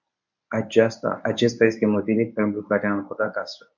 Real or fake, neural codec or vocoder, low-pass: fake; codec, 24 kHz, 0.9 kbps, WavTokenizer, medium speech release version 1; 7.2 kHz